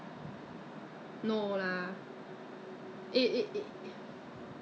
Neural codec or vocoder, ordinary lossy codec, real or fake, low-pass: none; none; real; none